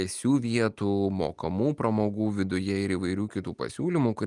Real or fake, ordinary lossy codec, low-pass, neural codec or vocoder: real; Opus, 32 kbps; 10.8 kHz; none